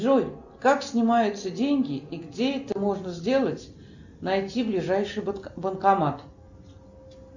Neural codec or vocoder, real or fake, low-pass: none; real; 7.2 kHz